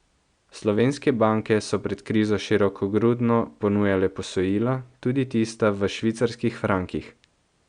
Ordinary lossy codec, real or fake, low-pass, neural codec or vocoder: Opus, 64 kbps; real; 9.9 kHz; none